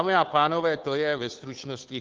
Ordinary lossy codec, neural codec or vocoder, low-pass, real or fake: Opus, 32 kbps; codec, 16 kHz, 2 kbps, FunCodec, trained on Chinese and English, 25 frames a second; 7.2 kHz; fake